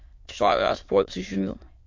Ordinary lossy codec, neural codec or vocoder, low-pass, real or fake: MP3, 48 kbps; autoencoder, 22.05 kHz, a latent of 192 numbers a frame, VITS, trained on many speakers; 7.2 kHz; fake